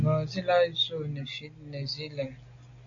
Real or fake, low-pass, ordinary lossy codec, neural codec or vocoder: real; 7.2 kHz; AAC, 48 kbps; none